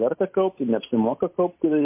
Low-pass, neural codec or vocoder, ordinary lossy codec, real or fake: 3.6 kHz; none; MP3, 24 kbps; real